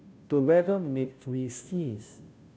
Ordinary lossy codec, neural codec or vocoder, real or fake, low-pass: none; codec, 16 kHz, 0.5 kbps, FunCodec, trained on Chinese and English, 25 frames a second; fake; none